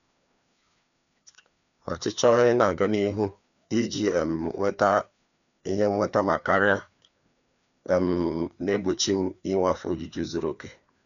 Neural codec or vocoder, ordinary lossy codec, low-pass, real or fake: codec, 16 kHz, 2 kbps, FreqCodec, larger model; none; 7.2 kHz; fake